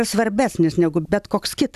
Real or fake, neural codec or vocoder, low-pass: real; none; 14.4 kHz